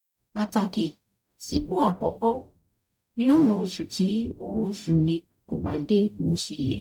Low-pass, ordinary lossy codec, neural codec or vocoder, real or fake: 19.8 kHz; none; codec, 44.1 kHz, 0.9 kbps, DAC; fake